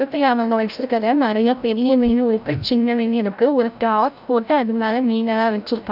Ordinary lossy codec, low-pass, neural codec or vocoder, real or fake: none; 5.4 kHz; codec, 16 kHz, 0.5 kbps, FreqCodec, larger model; fake